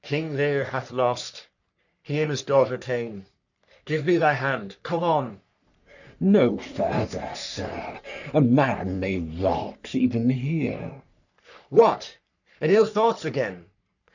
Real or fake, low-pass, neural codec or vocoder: fake; 7.2 kHz; codec, 44.1 kHz, 3.4 kbps, Pupu-Codec